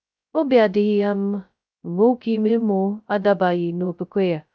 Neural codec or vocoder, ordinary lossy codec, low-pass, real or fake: codec, 16 kHz, 0.2 kbps, FocalCodec; none; none; fake